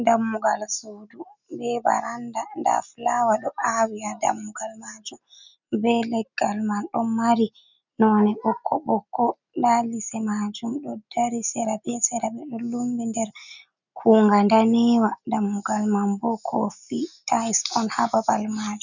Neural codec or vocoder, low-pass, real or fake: none; 7.2 kHz; real